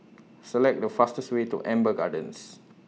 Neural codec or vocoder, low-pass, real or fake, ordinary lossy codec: none; none; real; none